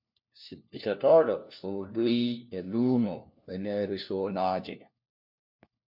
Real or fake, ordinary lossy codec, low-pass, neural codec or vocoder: fake; MP3, 48 kbps; 5.4 kHz; codec, 16 kHz, 1 kbps, FunCodec, trained on LibriTTS, 50 frames a second